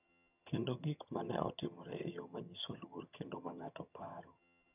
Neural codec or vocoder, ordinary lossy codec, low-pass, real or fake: vocoder, 22.05 kHz, 80 mel bands, HiFi-GAN; none; 3.6 kHz; fake